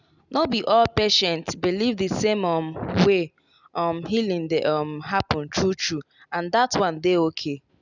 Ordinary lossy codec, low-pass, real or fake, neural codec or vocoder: none; 7.2 kHz; real; none